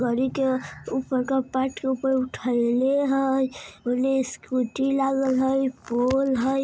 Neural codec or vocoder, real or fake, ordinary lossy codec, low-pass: none; real; none; none